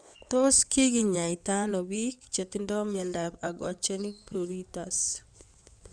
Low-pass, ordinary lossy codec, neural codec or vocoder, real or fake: 9.9 kHz; none; codec, 16 kHz in and 24 kHz out, 2.2 kbps, FireRedTTS-2 codec; fake